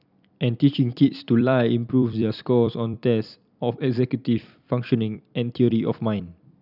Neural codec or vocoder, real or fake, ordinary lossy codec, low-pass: vocoder, 44.1 kHz, 128 mel bands every 256 samples, BigVGAN v2; fake; none; 5.4 kHz